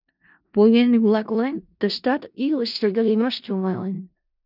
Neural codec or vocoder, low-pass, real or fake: codec, 16 kHz in and 24 kHz out, 0.4 kbps, LongCat-Audio-Codec, four codebook decoder; 5.4 kHz; fake